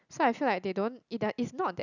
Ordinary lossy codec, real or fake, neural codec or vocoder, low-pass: none; real; none; 7.2 kHz